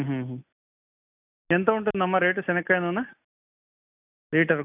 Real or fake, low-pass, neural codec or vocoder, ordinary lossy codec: real; 3.6 kHz; none; none